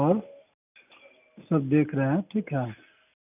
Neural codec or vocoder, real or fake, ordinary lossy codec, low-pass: none; real; none; 3.6 kHz